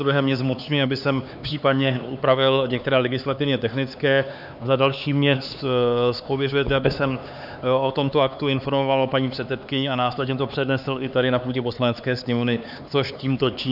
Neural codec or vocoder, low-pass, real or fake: codec, 16 kHz, 4 kbps, X-Codec, HuBERT features, trained on LibriSpeech; 5.4 kHz; fake